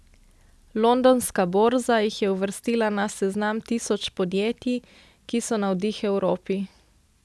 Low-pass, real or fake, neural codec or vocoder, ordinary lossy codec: none; real; none; none